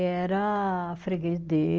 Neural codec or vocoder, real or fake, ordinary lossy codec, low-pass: none; real; Opus, 24 kbps; 7.2 kHz